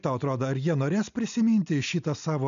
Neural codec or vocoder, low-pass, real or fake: none; 7.2 kHz; real